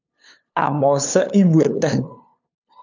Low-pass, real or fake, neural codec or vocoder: 7.2 kHz; fake; codec, 16 kHz, 2 kbps, FunCodec, trained on LibriTTS, 25 frames a second